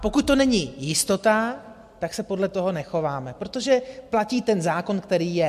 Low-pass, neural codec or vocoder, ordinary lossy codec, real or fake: 14.4 kHz; none; MP3, 64 kbps; real